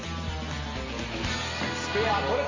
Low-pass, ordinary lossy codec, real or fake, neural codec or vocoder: 7.2 kHz; MP3, 32 kbps; real; none